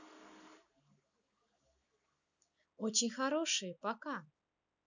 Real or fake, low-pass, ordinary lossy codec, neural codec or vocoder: real; 7.2 kHz; none; none